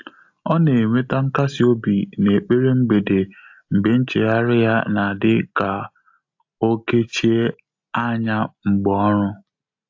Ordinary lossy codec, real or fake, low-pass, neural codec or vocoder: AAC, 48 kbps; real; 7.2 kHz; none